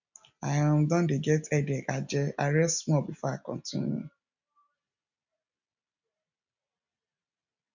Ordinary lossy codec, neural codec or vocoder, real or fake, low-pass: none; none; real; 7.2 kHz